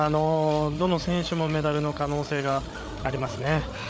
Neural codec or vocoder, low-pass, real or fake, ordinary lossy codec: codec, 16 kHz, 8 kbps, FreqCodec, larger model; none; fake; none